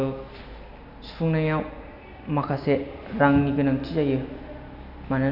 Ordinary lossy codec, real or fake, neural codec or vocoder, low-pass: none; real; none; 5.4 kHz